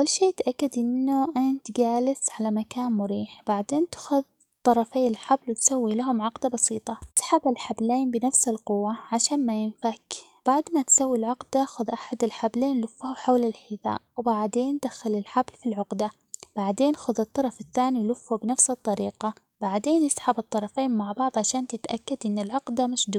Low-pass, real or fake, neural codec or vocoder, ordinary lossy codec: 19.8 kHz; fake; codec, 44.1 kHz, 7.8 kbps, DAC; none